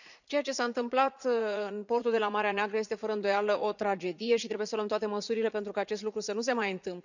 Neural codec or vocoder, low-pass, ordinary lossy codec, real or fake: vocoder, 44.1 kHz, 80 mel bands, Vocos; 7.2 kHz; none; fake